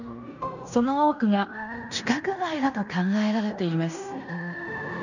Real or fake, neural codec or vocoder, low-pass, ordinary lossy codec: fake; codec, 16 kHz in and 24 kHz out, 0.9 kbps, LongCat-Audio-Codec, fine tuned four codebook decoder; 7.2 kHz; none